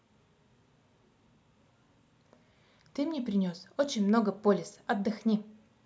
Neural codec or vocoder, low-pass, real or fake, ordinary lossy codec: none; none; real; none